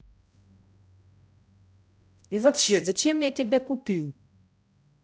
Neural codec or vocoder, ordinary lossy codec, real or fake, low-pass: codec, 16 kHz, 0.5 kbps, X-Codec, HuBERT features, trained on balanced general audio; none; fake; none